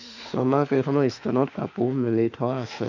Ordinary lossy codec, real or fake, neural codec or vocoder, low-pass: none; fake; autoencoder, 48 kHz, 32 numbers a frame, DAC-VAE, trained on Japanese speech; 7.2 kHz